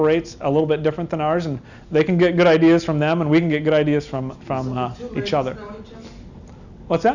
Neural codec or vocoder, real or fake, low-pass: none; real; 7.2 kHz